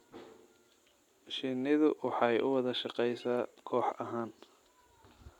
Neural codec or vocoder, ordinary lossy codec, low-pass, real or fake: none; none; 19.8 kHz; real